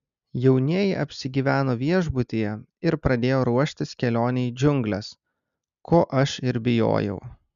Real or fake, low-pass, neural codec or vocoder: real; 7.2 kHz; none